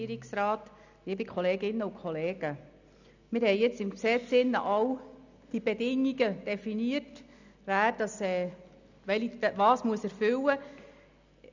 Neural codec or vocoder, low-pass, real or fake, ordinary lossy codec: none; 7.2 kHz; real; none